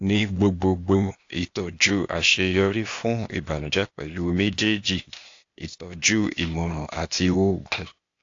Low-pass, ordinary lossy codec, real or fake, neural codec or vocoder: 7.2 kHz; AAC, 48 kbps; fake; codec, 16 kHz, 0.8 kbps, ZipCodec